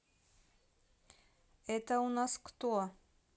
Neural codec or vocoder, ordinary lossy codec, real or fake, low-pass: none; none; real; none